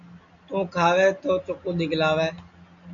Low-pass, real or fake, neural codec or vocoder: 7.2 kHz; real; none